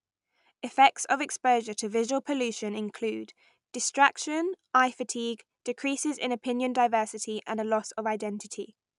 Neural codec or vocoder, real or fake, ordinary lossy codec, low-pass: none; real; none; 10.8 kHz